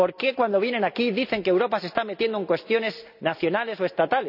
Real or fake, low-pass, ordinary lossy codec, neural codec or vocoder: real; 5.4 kHz; none; none